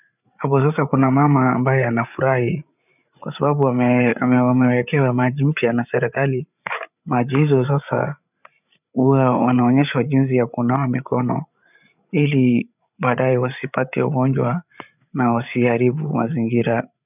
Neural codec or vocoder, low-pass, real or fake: codec, 16 kHz, 8 kbps, FreqCodec, larger model; 3.6 kHz; fake